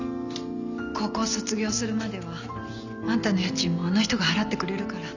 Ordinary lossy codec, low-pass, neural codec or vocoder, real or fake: none; 7.2 kHz; none; real